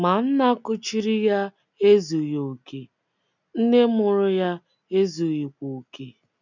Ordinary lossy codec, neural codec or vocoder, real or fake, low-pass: none; none; real; 7.2 kHz